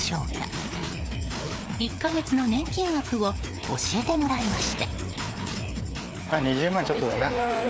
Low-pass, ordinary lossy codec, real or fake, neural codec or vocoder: none; none; fake; codec, 16 kHz, 4 kbps, FreqCodec, larger model